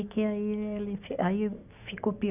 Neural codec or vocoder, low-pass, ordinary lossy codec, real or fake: none; 3.6 kHz; none; real